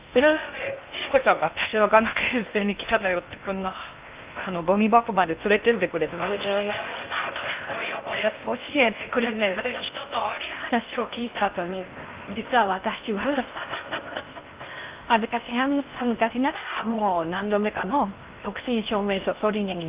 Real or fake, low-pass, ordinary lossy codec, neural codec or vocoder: fake; 3.6 kHz; Opus, 24 kbps; codec, 16 kHz in and 24 kHz out, 0.6 kbps, FocalCodec, streaming, 2048 codes